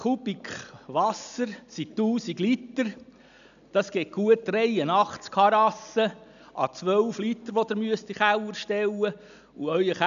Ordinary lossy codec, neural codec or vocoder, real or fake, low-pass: none; none; real; 7.2 kHz